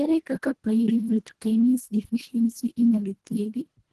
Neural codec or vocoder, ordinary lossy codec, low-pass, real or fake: codec, 24 kHz, 1.5 kbps, HILCodec; Opus, 16 kbps; 10.8 kHz; fake